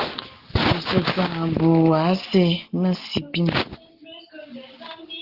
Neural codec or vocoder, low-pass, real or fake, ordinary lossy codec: none; 5.4 kHz; real; Opus, 16 kbps